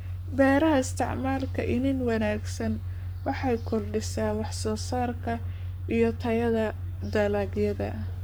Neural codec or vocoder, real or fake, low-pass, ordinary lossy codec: codec, 44.1 kHz, 7.8 kbps, Pupu-Codec; fake; none; none